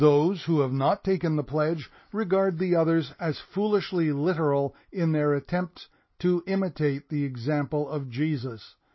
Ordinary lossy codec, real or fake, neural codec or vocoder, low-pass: MP3, 24 kbps; real; none; 7.2 kHz